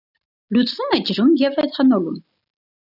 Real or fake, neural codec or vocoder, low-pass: real; none; 5.4 kHz